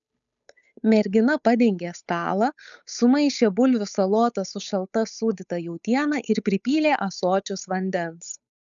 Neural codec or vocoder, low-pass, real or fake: codec, 16 kHz, 8 kbps, FunCodec, trained on Chinese and English, 25 frames a second; 7.2 kHz; fake